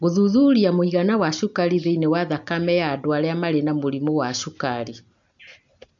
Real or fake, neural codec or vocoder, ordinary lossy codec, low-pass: real; none; MP3, 64 kbps; 7.2 kHz